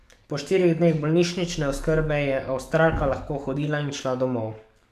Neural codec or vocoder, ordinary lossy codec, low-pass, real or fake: codec, 44.1 kHz, 7.8 kbps, DAC; none; 14.4 kHz; fake